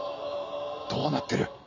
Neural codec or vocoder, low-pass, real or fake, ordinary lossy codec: none; 7.2 kHz; real; none